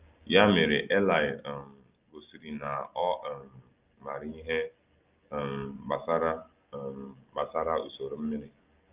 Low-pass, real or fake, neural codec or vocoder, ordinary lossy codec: 3.6 kHz; real; none; Opus, 24 kbps